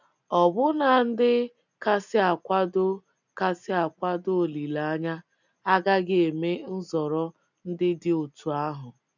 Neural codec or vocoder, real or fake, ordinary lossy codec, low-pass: none; real; none; 7.2 kHz